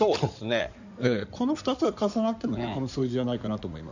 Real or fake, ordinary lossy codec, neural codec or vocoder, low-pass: fake; none; codec, 16 kHz in and 24 kHz out, 2.2 kbps, FireRedTTS-2 codec; 7.2 kHz